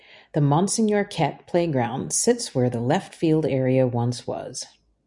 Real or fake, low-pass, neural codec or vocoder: real; 10.8 kHz; none